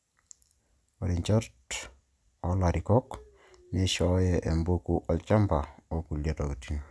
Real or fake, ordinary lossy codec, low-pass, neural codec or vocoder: real; none; none; none